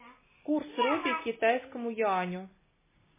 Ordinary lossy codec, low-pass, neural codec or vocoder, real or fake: MP3, 16 kbps; 3.6 kHz; none; real